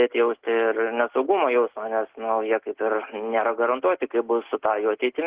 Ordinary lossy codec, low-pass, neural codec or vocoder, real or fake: Opus, 16 kbps; 3.6 kHz; none; real